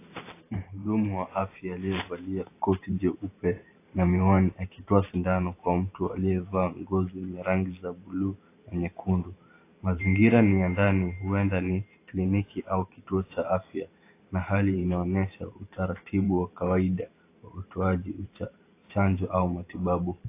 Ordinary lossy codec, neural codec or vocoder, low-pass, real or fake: AAC, 24 kbps; vocoder, 44.1 kHz, 128 mel bands every 512 samples, BigVGAN v2; 3.6 kHz; fake